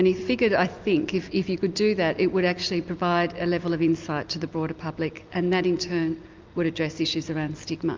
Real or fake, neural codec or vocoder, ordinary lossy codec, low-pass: real; none; Opus, 24 kbps; 7.2 kHz